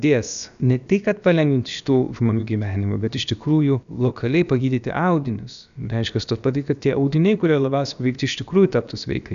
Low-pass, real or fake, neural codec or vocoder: 7.2 kHz; fake; codec, 16 kHz, about 1 kbps, DyCAST, with the encoder's durations